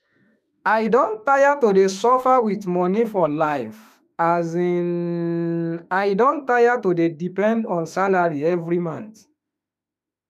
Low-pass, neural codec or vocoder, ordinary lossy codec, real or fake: 14.4 kHz; autoencoder, 48 kHz, 32 numbers a frame, DAC-VAE, trained on Japanese speech; none; fake